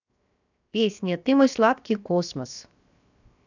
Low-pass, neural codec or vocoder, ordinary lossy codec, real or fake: 7.2 kHz; codec, 16 kHz, 0.7 kbps, FocalCodec; none; fake